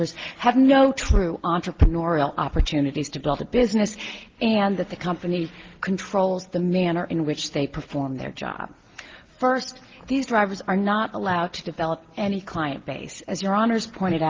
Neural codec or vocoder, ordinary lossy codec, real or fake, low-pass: none; Opus, 16 kbps; real; 7.2 kHz